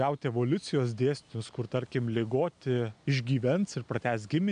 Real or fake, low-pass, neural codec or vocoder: real; 9.9 kHz; none